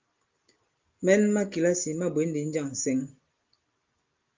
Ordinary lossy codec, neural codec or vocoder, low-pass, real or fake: Opus, 32 kbps; none; 7.2 kHz; real